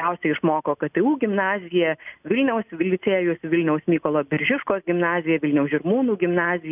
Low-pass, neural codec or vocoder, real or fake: 3.6 kHz; none; real